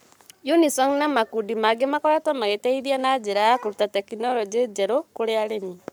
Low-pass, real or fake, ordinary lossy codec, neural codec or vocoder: none; fake; none; codec, 44.1 kHz, 7.8 kbps, Pupu-Codec